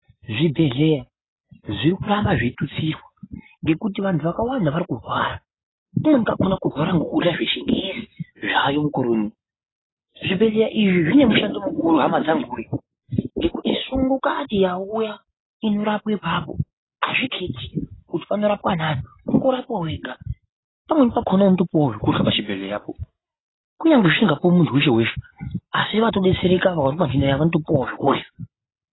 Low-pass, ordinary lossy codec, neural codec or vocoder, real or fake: 7.2 kHz; AAC, 16 kbps; none; real